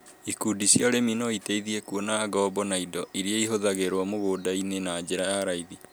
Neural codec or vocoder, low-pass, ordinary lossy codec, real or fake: none; none; none; real